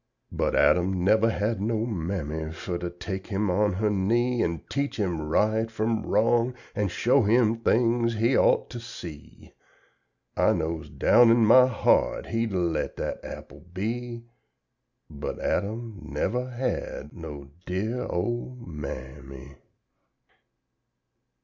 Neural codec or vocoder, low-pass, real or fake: none; 7.2 kHz; real